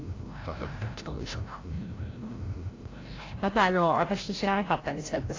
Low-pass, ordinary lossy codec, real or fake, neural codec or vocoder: 7.2 kHz; AAC, 32 kbps; fake; codec, 16 kHz, 0.5 kbps, FreqCodec, larger model